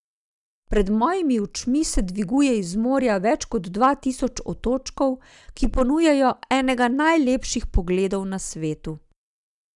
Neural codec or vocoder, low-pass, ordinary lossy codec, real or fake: none; 10.8 kHz; none; real